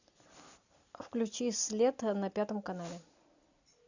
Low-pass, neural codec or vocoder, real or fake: 7.2 kHz; none; real